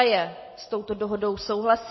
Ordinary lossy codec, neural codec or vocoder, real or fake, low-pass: MP3, 24 kbps; none; real; 7.2 kHz